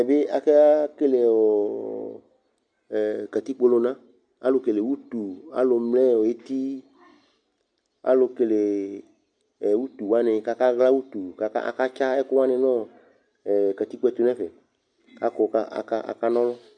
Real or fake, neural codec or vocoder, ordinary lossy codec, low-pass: real; none; MP3, 64 kbps; 9.9 kHz